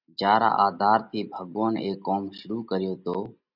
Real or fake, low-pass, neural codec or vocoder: real; 5.4 kHz; none